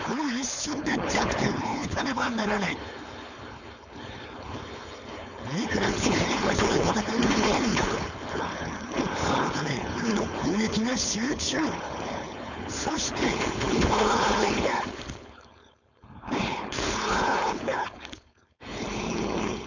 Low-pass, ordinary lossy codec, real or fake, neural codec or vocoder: 7.2 kHz; none; fake; codec, 16 kHz, 4.8 kbps, FACodec